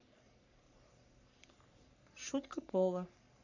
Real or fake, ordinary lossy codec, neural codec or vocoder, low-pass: fake; none; codec, 44.1 kHz, 3.4 kbps, Pupu-Codec; 7.2 kHz